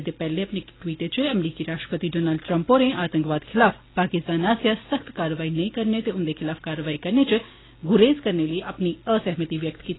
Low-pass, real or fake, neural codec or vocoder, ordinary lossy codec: 7.2 kHz; real; none; AAC, 16 kbps